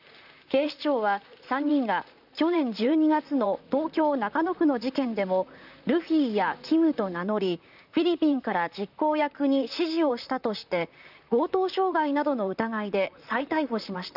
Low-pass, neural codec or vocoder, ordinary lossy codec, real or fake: 5.4 kHz; vocoder, 44.1 kHz, 128 mel bands, Pupu-Vocoder; none; fake